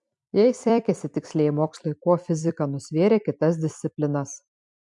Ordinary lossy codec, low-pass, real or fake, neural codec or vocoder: MP3, 64 kbps; 10.8 kHz; fake; vocoder, 44.1 kHz, 128 mel bands every 256 samples, BigVGAN v2